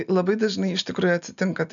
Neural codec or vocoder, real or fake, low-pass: none; real; 7.2 kHz